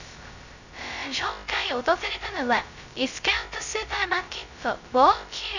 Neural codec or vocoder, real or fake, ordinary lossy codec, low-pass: codec, 16 kHz, 0.2 kbps, FocalCodec; fake; none; 7.2 kHz